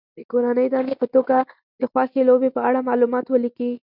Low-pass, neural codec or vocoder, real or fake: 5.4 kHz; none; real